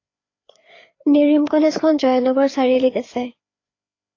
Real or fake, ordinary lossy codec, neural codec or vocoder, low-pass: fake; AAC, 32 kbps; codec, 16 kHz, 4 kbps, FreqCodec, larger model; 7.2 kHz